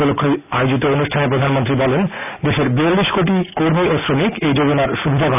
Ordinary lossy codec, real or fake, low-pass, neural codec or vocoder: none; real; 3.6 kHz; none